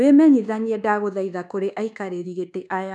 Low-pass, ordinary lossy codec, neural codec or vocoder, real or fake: none; none; codec, 24 kHz, 1.2 kbps, DualCodec; fake